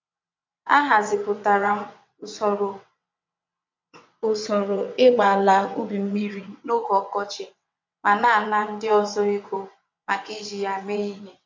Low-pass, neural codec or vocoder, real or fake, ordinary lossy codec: 7.2 kHz; vocoder, 22.05 kHz, 80 mel bands, WaveNeXt; fake; MP3, 48 kbps